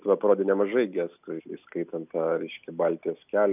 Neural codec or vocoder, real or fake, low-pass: none; real; 3.6 kHz